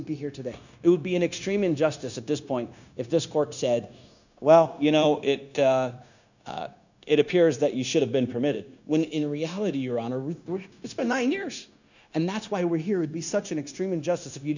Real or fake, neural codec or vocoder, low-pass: fake; codec, 16 kHz, 0.9 kbps, LongCat-Audio-Codec; 7.2 kHz